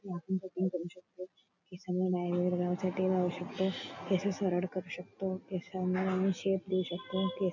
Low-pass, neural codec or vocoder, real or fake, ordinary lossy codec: 7.2 kHz; none; real; none